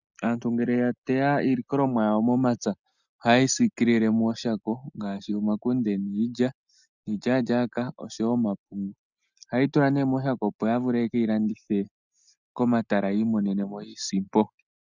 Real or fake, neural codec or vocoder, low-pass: real; none; 7.2 kHz